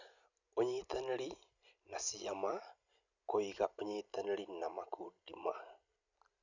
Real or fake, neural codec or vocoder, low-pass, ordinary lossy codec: real; none; 7.2 kHz; none